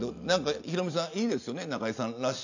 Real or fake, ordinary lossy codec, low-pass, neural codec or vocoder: real; none; 7.2 kHz; none